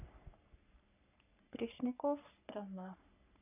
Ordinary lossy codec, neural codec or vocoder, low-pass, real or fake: none; codec, 44.1 kHz, 3.4 kbps, Pupu-Codec; 3.6 kHz; fake